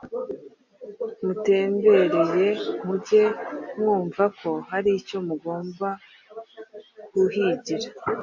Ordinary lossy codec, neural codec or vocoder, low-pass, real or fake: MP3, 48 kbps; none; 7.2 kHz; real